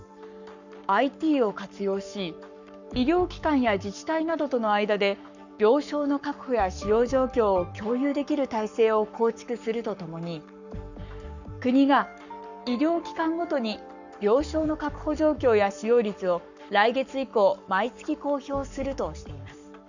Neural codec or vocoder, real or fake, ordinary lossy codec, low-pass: codec, 44.1 kHz, 7.8 kbps, Pupu-Codec; fake; none; 7.2 kHz